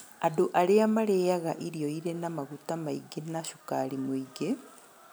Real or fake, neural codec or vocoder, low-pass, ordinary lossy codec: real; none; none; none